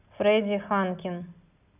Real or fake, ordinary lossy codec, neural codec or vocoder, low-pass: real; none; none; 3.6 kHz